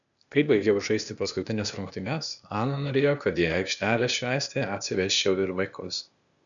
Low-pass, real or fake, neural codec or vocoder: 7.2 kHz; fake; codec, 16 kHz, 0.8 kbps, ZipCodec